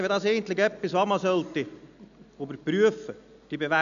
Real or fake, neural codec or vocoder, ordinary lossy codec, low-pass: real; none; none; 7.2 kHz